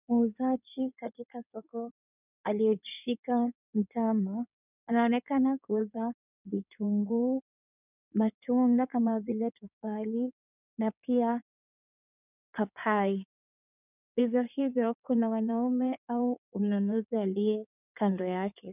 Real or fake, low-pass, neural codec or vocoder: fake; 3.6 kHz; codec, 16 kHz in and 24 kHz out, 2.2 kbps, FireRedTTS-2 codec